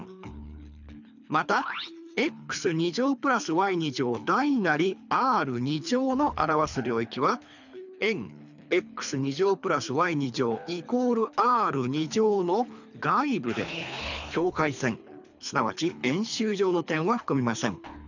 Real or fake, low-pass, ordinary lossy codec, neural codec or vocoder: fake; 7.2 kHz; none; codec, 24 kHz, 3 kbps, HILCodec